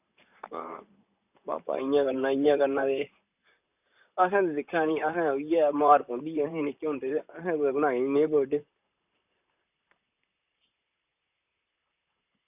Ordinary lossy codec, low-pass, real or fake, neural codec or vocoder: none; 3.6 kHz; fake; vocoder, 44.1 kHz, 128 mel bands, Pupu-Vocoder